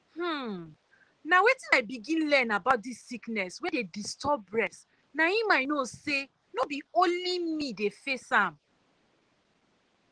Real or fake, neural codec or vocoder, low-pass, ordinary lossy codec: real; none; 9.9 kHz; Opus, 16 kbps